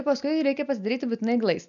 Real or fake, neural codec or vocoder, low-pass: real; none; 7.2 kHz